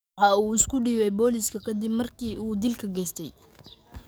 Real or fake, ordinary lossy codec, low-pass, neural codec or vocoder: fake; none; none; codec, 44.1 kHz, 7.8 kbps, DAC